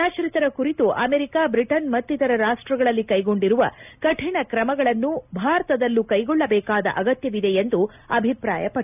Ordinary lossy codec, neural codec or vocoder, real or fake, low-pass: none; none; real; 3.6 kHz